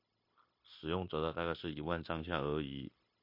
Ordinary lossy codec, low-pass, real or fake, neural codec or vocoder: MP3, 32 kbps; 5.4 kHz; fake; codec, 16 kHz, 0.9 kbps, LongCat-Audio-Codec